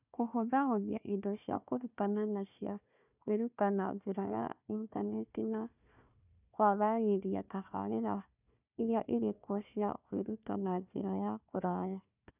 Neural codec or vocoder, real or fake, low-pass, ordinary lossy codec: codec, 16 kHz, 1 kbps, FunCodec, trained on Chinese and English, 50 frames a second; fake; 3.6 kHz; none